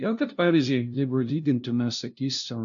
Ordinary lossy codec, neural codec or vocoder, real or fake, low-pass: AAC, 64 kbps; codec, 16 kHz, 0.5 kbps, FunCodec, trained on LibriTTS, 25 frames a second; fake; 7.2 kHz